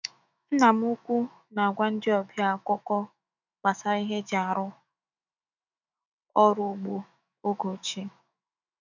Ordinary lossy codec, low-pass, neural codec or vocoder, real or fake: none; 7.2 kHz; autoencoder, 48 kHz, 128 numbers a frame, DAC-VAE, trained on Japanese speech; fake